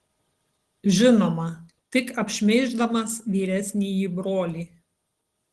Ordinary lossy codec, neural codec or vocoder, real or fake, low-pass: Opus, 16 kbps; none; real; 10.8 kHz